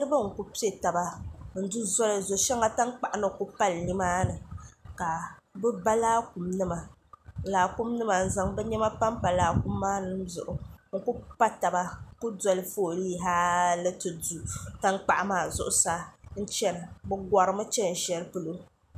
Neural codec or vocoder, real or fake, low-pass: none; real; 14.4 kHz